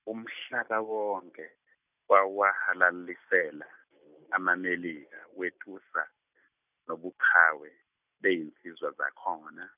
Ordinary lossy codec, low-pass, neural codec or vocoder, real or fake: none; 3.6 kHz; none; real